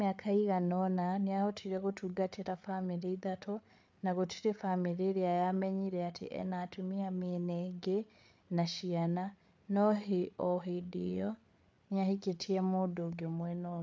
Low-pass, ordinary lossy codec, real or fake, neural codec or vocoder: none; none; fake; codec, 16 kHz, 8 kbps, FreqCodec, larger model